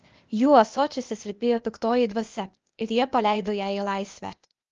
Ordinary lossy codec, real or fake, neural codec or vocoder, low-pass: Opus, 24 kbps; fake; codec, 16 kHz, 0.8 kbps, ZipCodec; 7.2 kHz